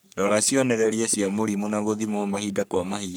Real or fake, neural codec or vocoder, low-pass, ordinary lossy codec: fake; codec, 44.1 kHz, 3.4 kbps, Pupu-Codec; none; none